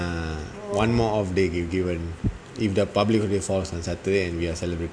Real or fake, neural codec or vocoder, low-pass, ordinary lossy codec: real; none; 9.9 kHz; none